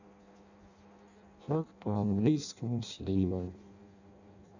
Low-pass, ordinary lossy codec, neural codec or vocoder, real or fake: 7.2 kHz; none; codec, 16 kHz in and 24 kHz out, 0.6 kbps, FireRedTTS-2 codec; fake